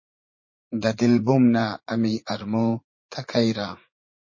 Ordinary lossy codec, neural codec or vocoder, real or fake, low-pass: MP3, 32 kbps; vocoder, 44.1 kHz, 80 mel bands, Vocos; fake; 7.2 kHz